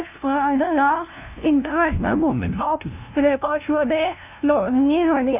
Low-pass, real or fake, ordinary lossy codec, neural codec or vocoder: 3.6 kHz; fake; none; codec, 16 kHz, 0.5 kbps, FunCodec, trained on LibriTTS, 25 frames a second